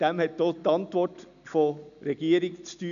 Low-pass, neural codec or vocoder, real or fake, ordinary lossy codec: 7.2 kHz; none; real; none